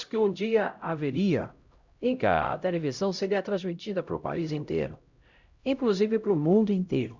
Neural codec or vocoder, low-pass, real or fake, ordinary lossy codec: codec, 16 kHz, 0.5 kbps, X-Codec, HuBERT features, trained on LibriSpeech; 7.2 kHz; fake; Opus, 64 kbps